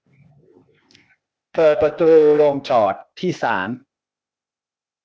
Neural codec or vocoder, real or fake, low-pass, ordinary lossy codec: codec, 16 kHz, 0.8 kbps, ZipCodec; fake; none; none